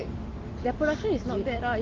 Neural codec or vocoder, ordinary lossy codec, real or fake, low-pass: none; Opus, 16 kbps; real; 7.2 kHz